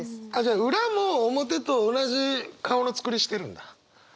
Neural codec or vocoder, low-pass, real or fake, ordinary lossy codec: none; none; real; none